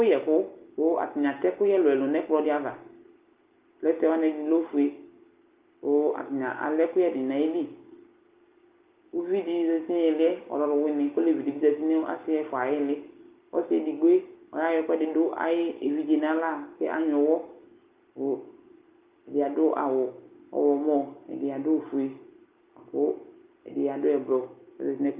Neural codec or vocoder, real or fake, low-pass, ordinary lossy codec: none; real; 3.6 kHz; Opus, 32 kbps